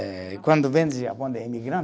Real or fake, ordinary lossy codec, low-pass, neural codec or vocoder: real; none; none; none